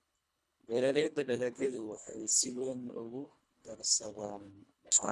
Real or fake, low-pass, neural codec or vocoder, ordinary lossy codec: fake; none; codec, 24 kHz, 1.5 kbps, HILCodec; none